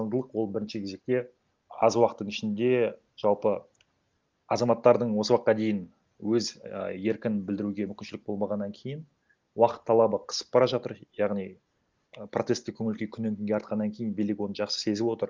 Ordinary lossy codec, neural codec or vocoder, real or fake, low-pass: Opus, 32 kbps; none; real; 7.2 kHz